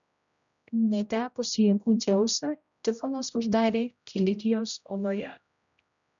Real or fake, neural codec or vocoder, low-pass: fake; codec, 16 kHz, 0.5 kbps, X-Codec, HuBERT features, trained on general audio; 7.2 kHz